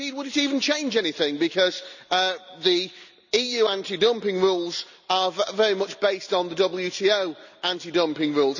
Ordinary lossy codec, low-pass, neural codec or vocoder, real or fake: none; 7.2 kHz; none; real